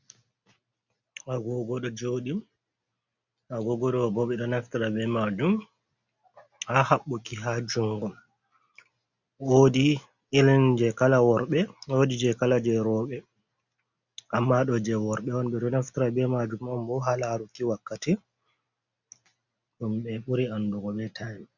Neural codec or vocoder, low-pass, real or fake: none; 7.2 kHz; real